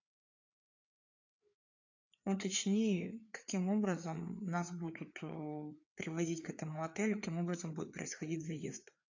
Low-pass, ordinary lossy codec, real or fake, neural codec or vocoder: 7.2 kHz; AAC, 48 kbps; fake; codec, 16 kHz, 4 kbps, FreqCodec, larger model